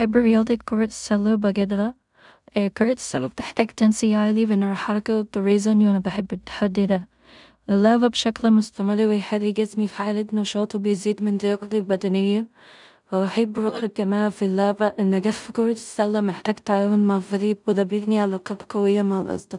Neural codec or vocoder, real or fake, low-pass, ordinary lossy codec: codec, 16 kHz in and 24 kHz out, 0.4 kbps, LongCat-Audio-Codec, two codebook decoder; fake; 10.8 kHz; none